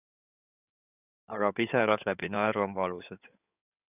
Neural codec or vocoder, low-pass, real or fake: codec, 16 kHz in and 24 kHz out, 2.2 kbps, FireRedTTS-2 codec; 3.6 kHz; fake